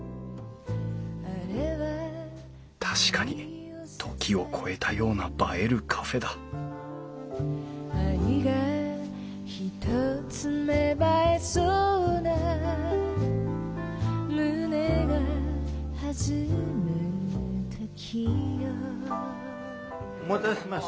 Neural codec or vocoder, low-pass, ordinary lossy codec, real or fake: none; none; none; real